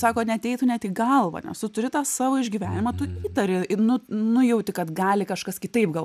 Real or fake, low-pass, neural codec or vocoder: real; 14.4 kHz; none